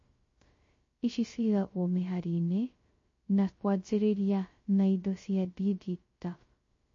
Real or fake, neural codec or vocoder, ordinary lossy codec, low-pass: fake; codec, 16 kHz, 0.2 kbps, FocalCodec; MP3, 32 kbps; 7.2 kHz